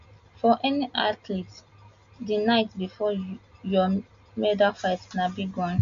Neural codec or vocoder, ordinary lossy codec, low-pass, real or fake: none; MP3, 64 kbps; 7.2 kHz; real